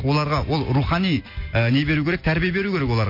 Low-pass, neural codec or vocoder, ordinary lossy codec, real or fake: 5.4 kHz; none; MP3, 32 kbps; real